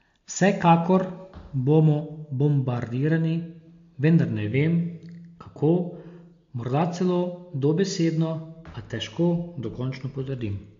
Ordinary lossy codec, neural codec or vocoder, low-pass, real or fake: MP3, 48 kbps; none; 7.2 kHz; real